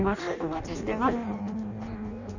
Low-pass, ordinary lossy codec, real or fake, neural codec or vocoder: 7.2 kHz; none; fake; codec, 16 kHz in and 24 kHz out, 0.6 kbps, FireRedTTS-2 codec